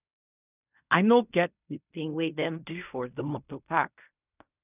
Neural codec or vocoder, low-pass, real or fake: codec, 16 kHz in and 24 kHz out, 0.4 kbps, LongCat-Audio-Codec, fine tuned four codebook decoder; 3.6 kHz; fake